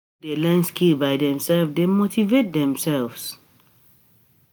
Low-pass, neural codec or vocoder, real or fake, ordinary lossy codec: none; none; real; none